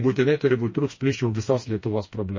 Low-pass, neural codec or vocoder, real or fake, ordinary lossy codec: 7.2 kHz; codec, 16 kHz, 2 kbps, FreqCodec, smaller model; fake; MP3, 32 kbps